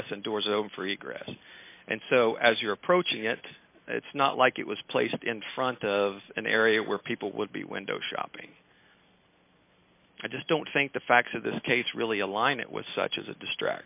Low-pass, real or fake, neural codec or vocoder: 3.6 kHz; real; none